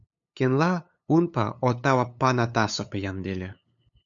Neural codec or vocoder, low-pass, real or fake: codec, 16 kHz, 8 kbps, FunCodec, trained on LibriTTS, 25 frames a second; 7.2 kHz; fake